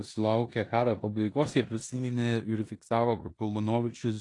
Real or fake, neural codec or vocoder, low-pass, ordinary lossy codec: fake; codec, 16 kHz in and 24 kHz out, 0.9 kbps, LongCat-Audio-Codec, four codebook decoder; 10.8 kHz; AAC, 48 kbps